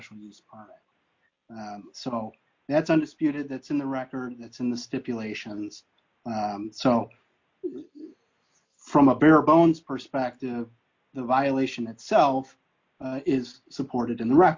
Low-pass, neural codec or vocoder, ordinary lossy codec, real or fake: 7.2 kHz; none; MP3, 48 kbps; real